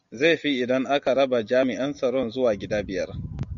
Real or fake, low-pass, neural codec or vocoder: real; 7.2 kHz; none